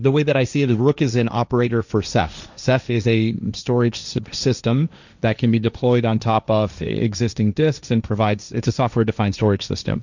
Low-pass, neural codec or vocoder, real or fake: 7.2 kHz; codec, 16 kHz, 1.1 kbps, Voila-Tokenizer; fake